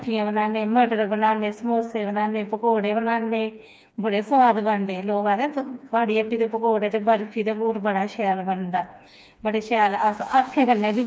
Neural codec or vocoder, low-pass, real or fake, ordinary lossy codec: codec, 16 kHz, 2 kbps, FreqCodec, smaller model; none; fake; none